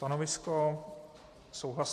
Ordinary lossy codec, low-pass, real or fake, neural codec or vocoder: MP3, 64 kbps; 14.4 kHz; real; none